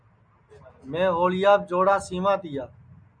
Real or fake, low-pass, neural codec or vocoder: real; 9.9 kHz; none